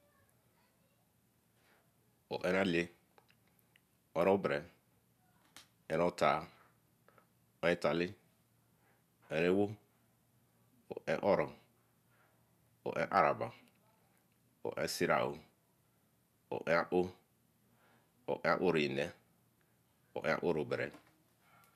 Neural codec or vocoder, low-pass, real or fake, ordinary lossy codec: none; 14.4 kHz; real; none